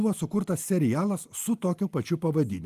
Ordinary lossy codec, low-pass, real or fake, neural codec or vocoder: Opus, 32 kbps; 14.4 kHz; real; none